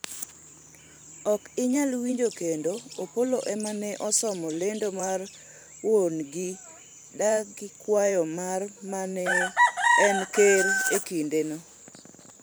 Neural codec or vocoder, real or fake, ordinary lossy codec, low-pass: vocoder, 44.1 kHz, 128 mel bands every 512 samples, BigVGAN v2; fake; none; none